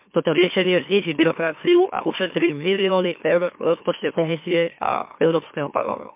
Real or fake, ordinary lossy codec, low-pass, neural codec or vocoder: fake; MP3, 32 kbps; 3.6 kHz; autoencoder, 44.1 kHz, a latent of 192 numbers a frame, MeloTTS